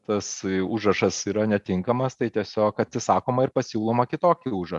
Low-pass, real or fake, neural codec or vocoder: 10.8 kHz; real; none